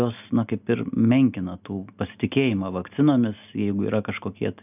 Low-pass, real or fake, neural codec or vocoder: 3.6 kHz; real; none